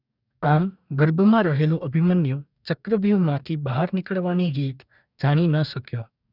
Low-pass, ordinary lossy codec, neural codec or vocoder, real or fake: 5.4 kHz; none; codec, 44.1 kHz, 2.6 kbps, DAC; fake